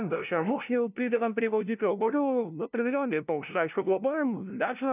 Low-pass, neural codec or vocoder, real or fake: 3.6 kHz; codec, 16 kHz, 0.5 kbps, FunCodec, trained on LibriTTS, 25 frames a second; fake